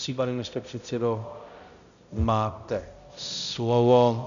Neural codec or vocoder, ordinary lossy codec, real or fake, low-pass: codec, 16 kHz, 0.5 kbps, X-Codec, HuBERT features, trained on balanced general audio; MP3, 96 kbps; fake; 7.2 kHz